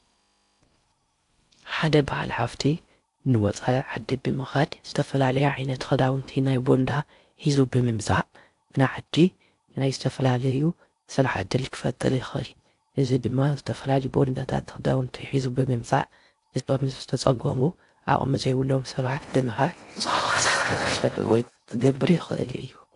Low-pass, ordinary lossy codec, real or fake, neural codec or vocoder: 10.8 kHz; AAC, 64 kbps; fake; codec, 16 kHz in and 24 kHz out, 0.6 kbps, FocalCodec, streaming, 4096 codes